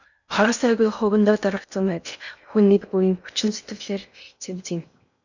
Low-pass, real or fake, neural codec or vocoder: 7.2 kHz; fake; codec, 16 kHz in and 24 kHz out, 0.6 kbps, FocalCodec, streaming, 2048 codes